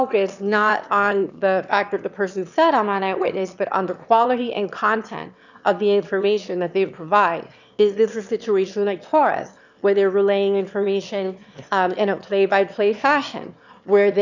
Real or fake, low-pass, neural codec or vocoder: fake; 7.2 kHz; autoencoder, 22.05 kHz, a latent of 192 numbers a frame, VITS, trained on one speaker